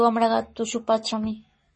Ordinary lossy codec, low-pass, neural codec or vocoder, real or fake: MP3, 32 kbps; 10.8 kHz; codec, 44.1 kHz, 7.8 kbps, Pupu-Codec; fake